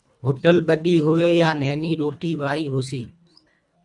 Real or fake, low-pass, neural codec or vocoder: fake; 10.8 kHz; codec, 24 kHz, 1.5 kbps, HILCodec